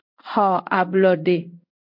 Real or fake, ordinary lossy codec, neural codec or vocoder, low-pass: fake; MP3, 48 kbps; codec, 16 kHz in and 24 kHz out, 1 kbps, XY-Tokenizer; 5.4 kHz